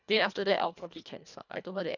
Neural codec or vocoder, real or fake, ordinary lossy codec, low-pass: codec, 24 kHz, 1.5 kbps, HILCodec; fake; none; 7.2 kHz